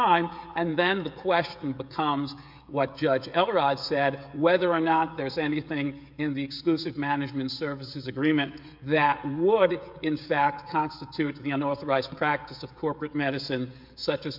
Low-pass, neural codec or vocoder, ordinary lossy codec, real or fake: 5.4 kHz; codec, 16 kHz, 16 kbps, FreqCodec, smaller model; MP3, 48 kbps; fake